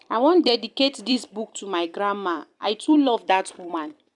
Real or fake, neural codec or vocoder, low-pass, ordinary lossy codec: fake; vocoder, 44.1 kHz, 128 mel bands every 256 samples, BigVGAN v2; 10.8 kHz; none